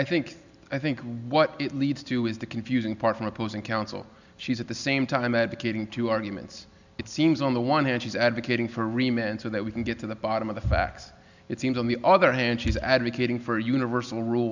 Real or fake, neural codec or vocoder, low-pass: real; none; 7.2 kHz